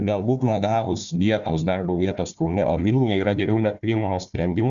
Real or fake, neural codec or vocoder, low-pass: fake; codec, 16 kHz, 1 kbps, FunCodec, trained on Chinese and English, 50 frames a second; 7.2 kHz